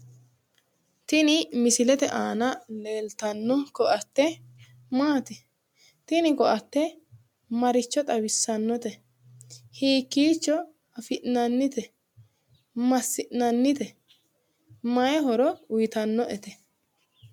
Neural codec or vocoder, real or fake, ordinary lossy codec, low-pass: none; real; MP3, 96 kbps; 19.8 kHz